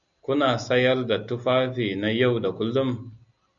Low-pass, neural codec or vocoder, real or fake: 7.2 kHz; none; real